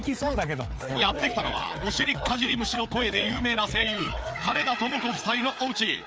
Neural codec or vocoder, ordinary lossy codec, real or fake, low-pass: codec, 16 kHz, 4 kbps, FreqCodec, larger model; none; fake; none